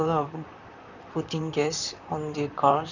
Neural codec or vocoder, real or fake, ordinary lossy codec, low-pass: vocoder, 44.1 kHz, 128 mel bands, Pupu-Vocoder; fake; none; 7.2 kHz